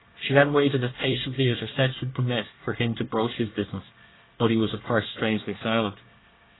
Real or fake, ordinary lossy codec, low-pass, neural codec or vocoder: fake; AAC, 16 kbps; 7.2 kHz; codec, 24 kHz, 1 kbps, SNAC